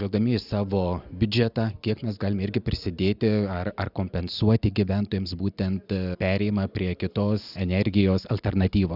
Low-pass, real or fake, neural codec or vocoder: 5.4 kHz; real; none